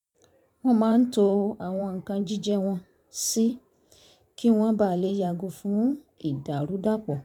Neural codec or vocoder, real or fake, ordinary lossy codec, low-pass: vocoder, 44.1 kHz, 128 mel bands every 512 samples, BigVGAN v2; fake; none; 19.8 kHz